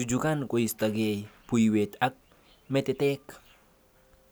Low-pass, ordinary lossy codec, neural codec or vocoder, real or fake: none; none; none; real